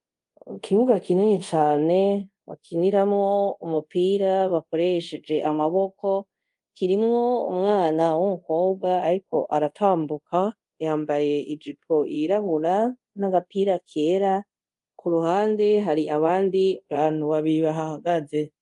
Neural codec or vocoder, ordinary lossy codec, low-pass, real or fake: codec, 24 kHz, 0.5 kbps, DualCodec; Opus, 32 kbps; 10.8 kHz; fake